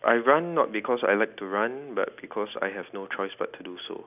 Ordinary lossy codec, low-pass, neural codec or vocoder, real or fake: none; 3.6 kHz; none; real